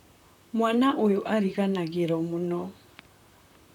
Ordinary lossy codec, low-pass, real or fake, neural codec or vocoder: none; 19.8 kHz; fake; vocoder, 44.1 kHz, 128 mel bands, Pupu-Vocoder